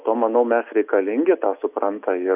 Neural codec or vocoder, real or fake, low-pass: none; real; 3.6 kHz